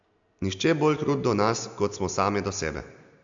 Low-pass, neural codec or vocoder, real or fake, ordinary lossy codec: 7.2 kHz; none; real; AAC, 64 kbps